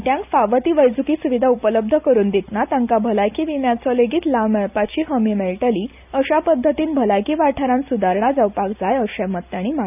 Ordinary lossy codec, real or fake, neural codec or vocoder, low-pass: none; real; none; 3.6 kHz